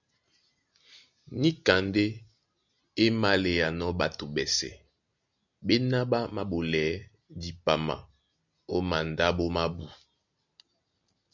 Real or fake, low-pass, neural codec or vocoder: real; 7.2 kHz; none